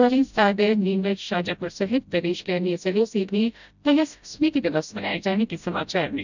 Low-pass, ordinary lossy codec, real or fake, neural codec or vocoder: 7.2 kHz; none; fake; codec, 16 kHz, 0.5 kbps, FreqCodec, smaller model